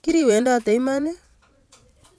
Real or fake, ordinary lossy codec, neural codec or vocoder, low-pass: fake; none; vocoder, 22.05 kHz, 80 mel bands, Vocos; none